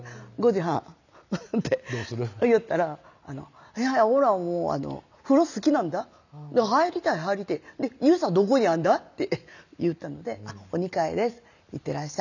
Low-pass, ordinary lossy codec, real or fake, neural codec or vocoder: 7.2 kHz; none; real; none